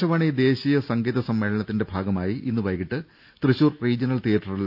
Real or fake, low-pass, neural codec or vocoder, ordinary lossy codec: real; 5.4 kHz; none; none